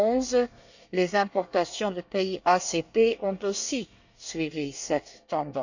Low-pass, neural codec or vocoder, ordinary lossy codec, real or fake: 7.2 kHz; codec, 24 kHz, 1 kbps, SNAC; none; fake